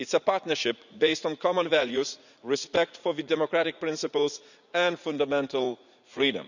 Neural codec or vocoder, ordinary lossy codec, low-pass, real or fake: vocoder, 44.1 kHz, 80 mel bands, Vocos; none; 7.2 kHz; fake